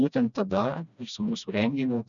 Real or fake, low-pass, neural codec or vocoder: fake; 7.2 kHz; codec, 16 kHz, 1 kbps, FreqCodec, smaller model